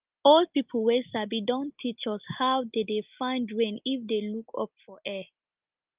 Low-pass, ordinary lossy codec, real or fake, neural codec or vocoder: 3.6 kHz; Opus, 32 kbps; real; none